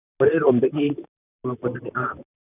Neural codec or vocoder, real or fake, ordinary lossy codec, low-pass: vocoder, 44.1 kHz, 128 mel bands, Pupu-Vocoder; fake; none; 3.6 kHz